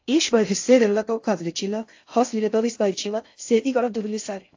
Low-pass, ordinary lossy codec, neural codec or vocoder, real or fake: 7.2 kHz; AAC, 48 kbps; codec, 16 kHz in and 24 kHz out, 0.6 kbps, FocalCodec, streaming, 2048 codes; fake